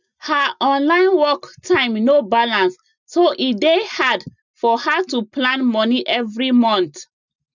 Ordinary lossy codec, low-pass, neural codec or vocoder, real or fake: none; 7.2 kHz; none; real